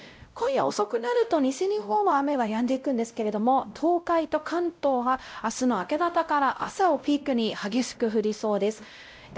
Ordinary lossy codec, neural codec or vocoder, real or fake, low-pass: none; codec, 16 kHz, 0.5 kbps, X-Codec, WavLM features, trained on Multilingual LibriSpeech; fake; none